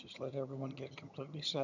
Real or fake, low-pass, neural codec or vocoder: fake; 7.2 kHz; vocoder, 22.05 kHz, 80 mel bands, HiFi-GAN